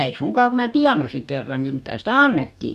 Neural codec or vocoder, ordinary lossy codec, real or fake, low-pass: codec, 44.1 kHz, 2.6 kbps, DAC; none; fake; 14.4 kHz